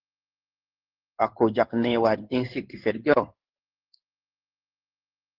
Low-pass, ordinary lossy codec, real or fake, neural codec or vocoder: 5.4 kHz; Opus, 16 kbps; fake; codec, 16 kHz in and 24 kHz out, 1 kbps, XY-Tokenizer